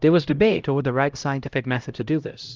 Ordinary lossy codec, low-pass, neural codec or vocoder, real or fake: Opus, 24 kbps; 7.2 kHz; codec, 16 kHz, 0.5 kbps, X-Codec, HuBERT features, trained on LibriSpeech; fake